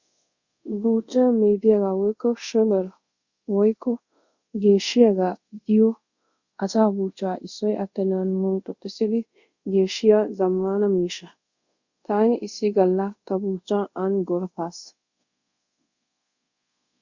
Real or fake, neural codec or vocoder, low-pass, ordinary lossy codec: fake; codec, 24 kHz, 0.5 kbps, DualCodec; 7.2 kHz; Opus, 64 kbps